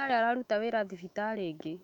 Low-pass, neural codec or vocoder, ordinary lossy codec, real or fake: 19.8 kHz; autoencoder, 48 kHz, 128 numbers a frame, DAC-VAE, trained on Japanese speech; none; fake